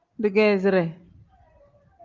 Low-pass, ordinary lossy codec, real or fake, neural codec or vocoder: 7.2 kHz; Opus, 24 kbps; real; none